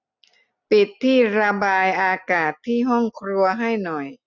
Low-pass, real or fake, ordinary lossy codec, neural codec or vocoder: 7.2 kHz; real; none; none